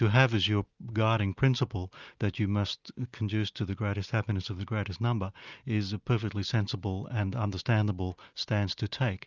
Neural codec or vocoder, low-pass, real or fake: none; 7.2 kHz; real